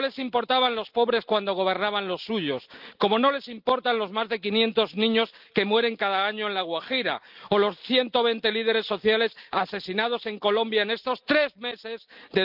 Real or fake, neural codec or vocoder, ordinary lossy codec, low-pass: real; none; Opus, 24 kbps; 5.4 kHz